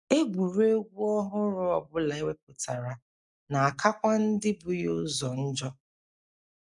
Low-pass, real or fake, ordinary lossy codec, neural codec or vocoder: 10.8 kHz; real; none; none